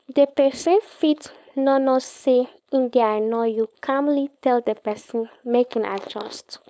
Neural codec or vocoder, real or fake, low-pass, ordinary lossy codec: codec, 16 kHz, 4.8 kbps, FACodec; fake; none; none